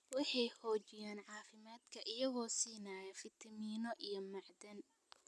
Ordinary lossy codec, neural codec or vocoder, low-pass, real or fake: none; none; none; real